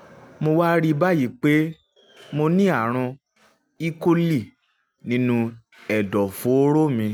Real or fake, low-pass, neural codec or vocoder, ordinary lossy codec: real; 19.8 kHz; none; none